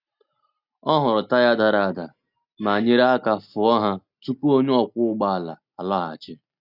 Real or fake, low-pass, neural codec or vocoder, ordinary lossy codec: real; 5.4 kHz; none; none